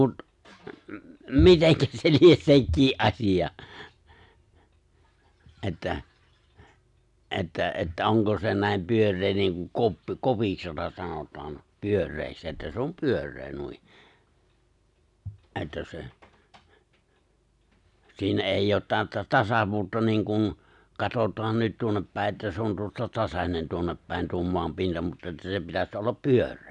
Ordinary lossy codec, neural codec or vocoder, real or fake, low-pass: none; none; real; 10.8 kHz